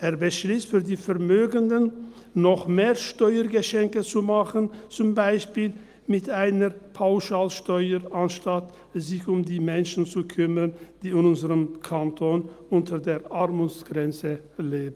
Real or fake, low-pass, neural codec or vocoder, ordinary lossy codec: real; 14.4 kHz; none; Opus, 32 kbps